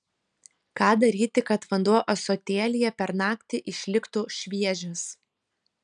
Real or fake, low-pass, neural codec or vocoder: real; 9.9 kHz; none